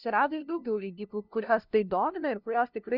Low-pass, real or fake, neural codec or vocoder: 5.4 kHz; fake; codec, 16 kHz, 0.5 kbps, FunCodec, trained on LibriTTS, 25 frames a second